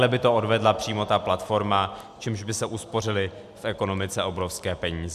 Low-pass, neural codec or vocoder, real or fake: 14.4 kHz; none; real